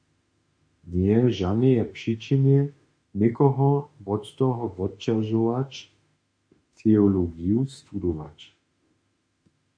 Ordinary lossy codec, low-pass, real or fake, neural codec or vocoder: MP3, 48 kbps; 9.9 kHz; fake; autoencoder, 48 kHz, 32 numbers a frame, DAC-VAE, trained on Japanese speech